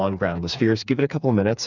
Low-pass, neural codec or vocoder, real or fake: 7.2 kHz; codec, 16 kHz, 4 kbps, FreqCodec, smaller model; fake